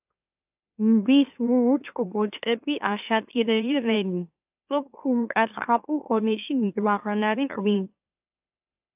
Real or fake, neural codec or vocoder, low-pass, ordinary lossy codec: fake; autoencoder, 44.1 kHz, a latent of 192 numbers a frame, MeloTTS; 3.6 kHz; AAC, 32 kbps